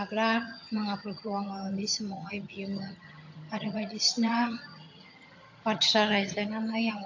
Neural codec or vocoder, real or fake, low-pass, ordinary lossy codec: vocoder, 22.05 kHz, 80 mel bands, HiFi-GAN; fake; 7.2 kHz; AAC, 48 kbps